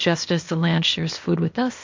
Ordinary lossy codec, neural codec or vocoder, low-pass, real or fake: MP3, 48 kbps; codec, 16 kHz, 0.8 kbps, ZipCodec; 7.2 kHz; fake